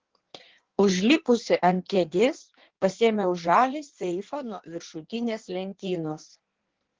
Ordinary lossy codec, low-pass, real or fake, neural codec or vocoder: Opus, 16 kbps; 7.2 kHz; fake; codec, 16 kHz in and 24 kHz out, 1.1 kbps, FireRedTTS-2 codec